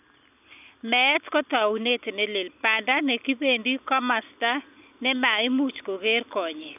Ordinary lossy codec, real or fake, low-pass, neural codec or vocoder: none; real; 3.6 kHz; none